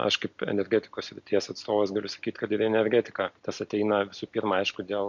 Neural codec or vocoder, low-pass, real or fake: none; 7.2 kHz; real